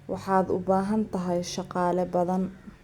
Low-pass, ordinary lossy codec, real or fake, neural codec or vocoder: 19.8 kHz; Opus, 64 kbps; real; none